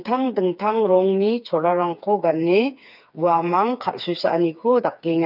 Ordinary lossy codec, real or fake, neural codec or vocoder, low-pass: none; fake; codec, 16 kHz, 4 kbps, FreqCodec, smaller model; 5.4 kHz